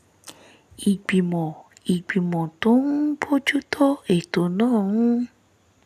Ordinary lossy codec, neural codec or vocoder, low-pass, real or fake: none; none; 14.4 kHz; real